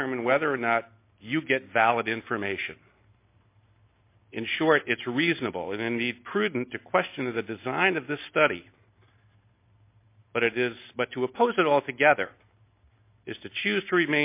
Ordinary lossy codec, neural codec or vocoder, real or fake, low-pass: MP3, 24 kbps; none; real; 3.6 kHz